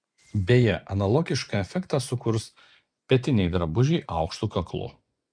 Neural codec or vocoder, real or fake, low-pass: vocoder, 24 kHz, 100 mel bands, Vocos; fake; 9.9 kHz